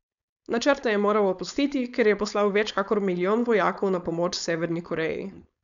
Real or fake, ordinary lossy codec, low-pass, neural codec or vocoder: fake; MP3, 96 kbps; 7.2 kHz; codec, 16 kHz, 4.8 kbps, FACodec